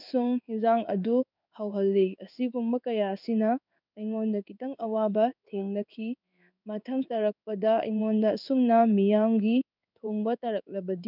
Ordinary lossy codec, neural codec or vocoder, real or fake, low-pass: none; codec, 16 kHz in and 24 kHz out, 1 kbps, XY-Tokenizer; fake; 5.4 kHz